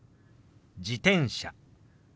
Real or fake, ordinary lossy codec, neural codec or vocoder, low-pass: real; none; none; none